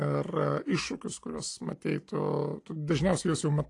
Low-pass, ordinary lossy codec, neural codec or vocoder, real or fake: 10.8 kHz; AAC, 48 kbps; none; real